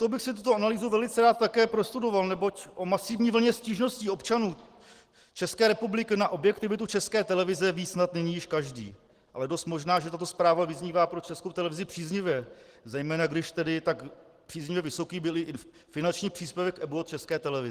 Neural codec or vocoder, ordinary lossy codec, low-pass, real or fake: none; Opus, 16 kbps; 14.4 kHz; real